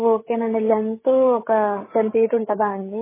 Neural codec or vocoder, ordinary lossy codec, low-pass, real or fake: codec, 16 kHz, 8 kbps, FreqCodec, larger model; MP3, 16 kbps; 3.6 kHz; fake